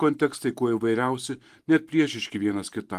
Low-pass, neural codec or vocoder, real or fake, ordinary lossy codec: 14.4 kHz; vocoder, 44.1 kHz, 128 mel bands every 512 samples, BigVGAN v2; fake; Opus, 24 kbps